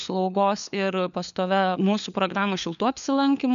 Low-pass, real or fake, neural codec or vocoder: 7.2 kHz; fake; codec, 16 kHz, 4 kbps, FunCodec, trained on LibriTTS, 50 frames a second